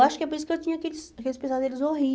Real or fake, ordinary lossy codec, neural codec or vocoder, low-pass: real; none; none; none